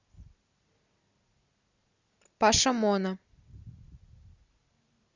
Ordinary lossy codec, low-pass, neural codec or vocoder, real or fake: Opus, 64 kbps; 7.2 kHz; none; real